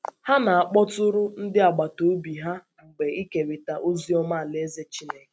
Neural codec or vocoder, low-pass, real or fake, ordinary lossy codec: none; none; real; none